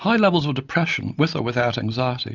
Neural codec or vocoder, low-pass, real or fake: none; 7.2 kHz; real